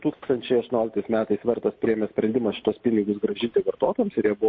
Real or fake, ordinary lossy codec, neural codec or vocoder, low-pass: real; MP3, 32 kbps; none; 7.2 kHz